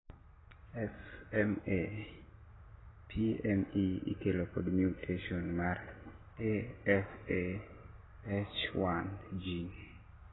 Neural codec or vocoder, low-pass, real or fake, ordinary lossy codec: none; 7.2 kHz; real; AAC, 16 kbps